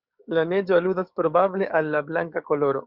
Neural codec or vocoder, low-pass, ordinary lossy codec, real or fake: codec, 44.1 kHz, 7.8 kbps, DAC; 5.4 kHz; Opus, 64 kbps; fake